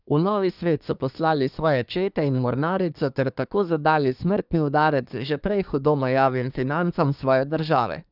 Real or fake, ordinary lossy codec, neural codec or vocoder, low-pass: fake; none; codec, 24 kHz, 1 kbps, SNAC; 5.4 kHz